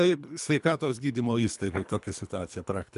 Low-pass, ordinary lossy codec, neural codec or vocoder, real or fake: 10.8 kHz; MP3, 96 kbps; codec, 24 kHz, 3 kbps, HILCodec; fake